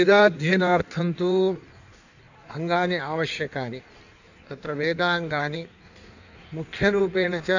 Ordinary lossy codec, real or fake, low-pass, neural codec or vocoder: none; fake; 7.2 kHz; codec, 16 kHz in and 24 kHz out, 1.1 kbps, FireRedTTS-2 codec